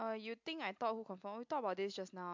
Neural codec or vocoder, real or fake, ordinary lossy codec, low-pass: none; real; MP3, 64 kbps; 7.2 kHz